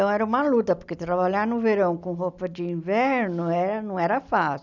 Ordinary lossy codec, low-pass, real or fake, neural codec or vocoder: none; 7.2 kHz; real; none